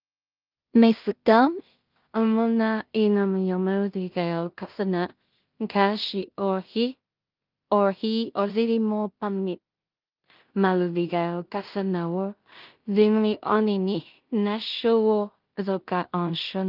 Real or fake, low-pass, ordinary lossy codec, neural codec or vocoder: fake; 5.4 kHz; Opus, 24 kbps; codec, 16 kHz in and 24 kHz out, 0.4 kbps, LongCat-Audio-Codec, two codebook decoder